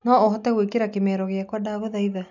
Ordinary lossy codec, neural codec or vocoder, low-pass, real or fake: none; none; 7.2 kHz; real